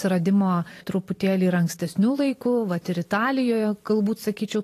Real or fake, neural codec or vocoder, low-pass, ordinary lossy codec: fake; vocoder, 44.1 kHz, 128 mel bands every 512 samples, BigVGAN v2; 14.4 kHz; AAC, 48 kbps